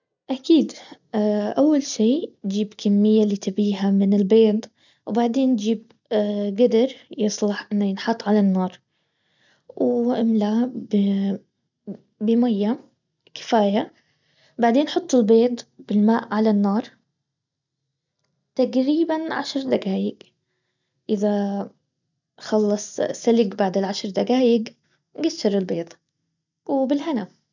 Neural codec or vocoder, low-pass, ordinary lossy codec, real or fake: none; 7.2 kHz; none; real